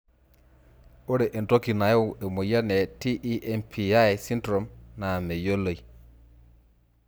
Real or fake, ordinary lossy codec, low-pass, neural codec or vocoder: real; none; none; none